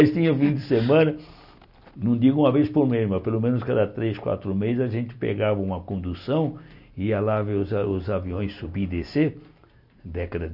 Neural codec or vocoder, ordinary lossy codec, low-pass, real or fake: none; none; 5.4 kHz; real